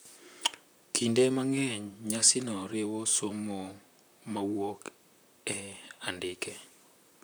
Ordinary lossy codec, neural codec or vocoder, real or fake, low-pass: none; vocoder, 44.1 kHz, 128 mel bands, Pupu-Vocoder; fake; none